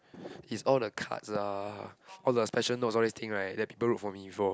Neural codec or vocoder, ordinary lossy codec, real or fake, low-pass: none; none; real; none